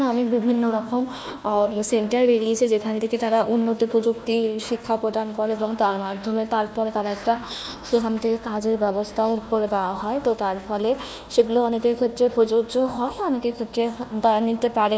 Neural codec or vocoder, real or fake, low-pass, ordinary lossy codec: codec, 16 kHz, 1 kbps, FunCodec, trained on Chinese and English, 50 frames a second; fake; none; none